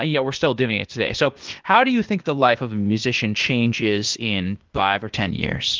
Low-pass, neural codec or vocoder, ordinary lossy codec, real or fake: 7.2 kHz; codec, 16 kHz, 0.8 kbps, ZipCodec; Opus, 24 kbps; fake